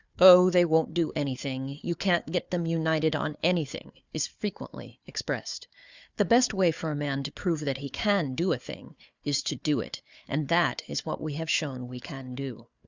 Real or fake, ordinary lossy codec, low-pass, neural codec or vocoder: fake; Opus, 64 kbps; 7.2 kHz; codec, 16 kHz, 4 kbps, FunCodec, trained on Chinese and English, 50 frames a second